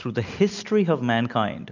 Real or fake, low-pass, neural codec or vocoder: real; 7.2 kHz; none